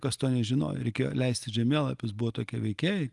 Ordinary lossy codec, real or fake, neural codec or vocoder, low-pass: Opus, 32 kbps; real; none; 10.8 kHz